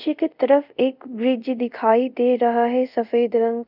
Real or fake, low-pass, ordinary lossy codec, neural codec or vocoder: fake; 5.4 kHz; none; codec, 24 kHz, 0.5 kbps, DualCodec